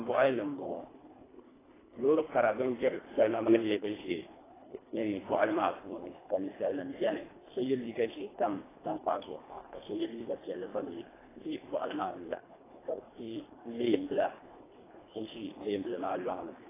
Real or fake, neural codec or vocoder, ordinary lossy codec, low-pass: fake; codec, 24 kHz, 1.5 kbps, HILCodec; AAC, 16 kbps; 3.6 kHz